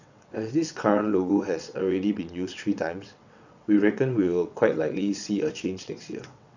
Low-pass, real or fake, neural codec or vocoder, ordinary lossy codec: 7.2 kHz; fake; vocoder, 22.05 kHz, 80 mel bands, WaveNeXt; none